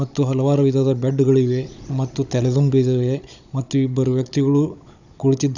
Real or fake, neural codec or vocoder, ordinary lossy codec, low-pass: fake; codec, 16 kHz, 16 kbps, FunCodec, trained on Chinese and English, 50 frames a second; none; 7.2 kHz